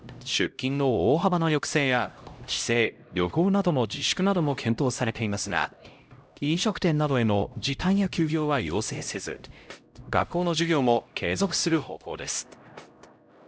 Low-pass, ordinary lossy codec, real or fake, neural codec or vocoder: none; none; fake; codec, 16 kHz, 0.5 kbps, X-Codec, HuBERT features, trained on LibriSpeech